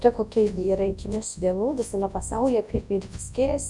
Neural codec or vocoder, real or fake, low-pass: codec, 24 kHz, 0.9 kbps, WavTokenizer, large speech release; fake; 10.8 kHz